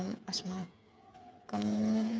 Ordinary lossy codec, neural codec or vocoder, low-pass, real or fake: none; codec, 16 kHz, 8 kbps, FreqCodec, smaller model; none; fake